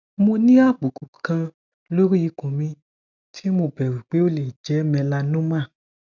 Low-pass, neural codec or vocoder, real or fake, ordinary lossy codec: 7.2 kHz; none; real; none